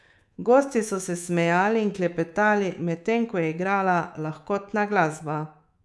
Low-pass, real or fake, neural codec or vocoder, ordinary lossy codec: none; fake; codec, 24 kHz, 3.1 kbps, DualCodec; none